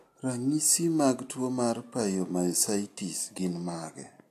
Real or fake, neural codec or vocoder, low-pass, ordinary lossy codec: real; none; 14.4 kHz; AAC, 64 kbps